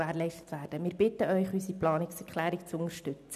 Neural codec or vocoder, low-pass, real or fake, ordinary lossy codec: none; 14.4 kHz; real; none